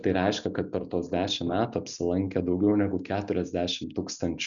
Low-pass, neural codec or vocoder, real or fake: 7.2 kHz; none; real